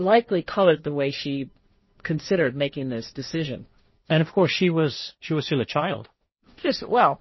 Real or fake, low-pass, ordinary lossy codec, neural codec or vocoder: fake; 7.2 kHz; MP3, 24 kbps; codec, 16 kHz, 1.1 kbps, Voila-Tokenizer